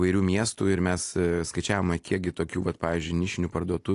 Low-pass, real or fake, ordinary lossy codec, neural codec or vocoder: 10.8 kHz; real; AAC, 48 kbps; none